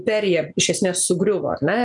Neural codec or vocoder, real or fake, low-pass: none; real; 10.8 kHz